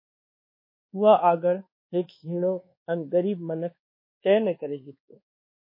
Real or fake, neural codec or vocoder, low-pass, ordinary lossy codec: fake; codec, 24 kHz, 1.2 kbps, DualCodec; 5.4 kHz; MP3, 24 kbps